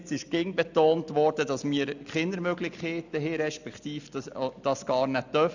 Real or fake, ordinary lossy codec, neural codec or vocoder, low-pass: real; none; none; 7.2 kHz